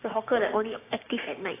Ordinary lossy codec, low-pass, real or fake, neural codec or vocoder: AAC, 16 kbps; 3.6 kHz; fake; codec, 16 kHz, 6 kbps, DAC